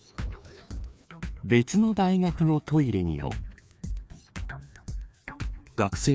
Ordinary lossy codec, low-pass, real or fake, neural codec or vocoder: none; none; fake; codec, 16 kHz, 2 kbps, FreqCodec, larger model